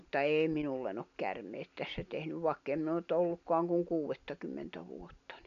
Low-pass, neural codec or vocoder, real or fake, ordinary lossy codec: 7.2 kHz; none; real; none